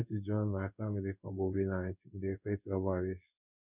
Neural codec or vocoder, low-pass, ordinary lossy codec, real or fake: codec, 16 kHz in and 24 kHz out, 1 kbps, XY-Tokenizer; 3.6 kHz; none; fake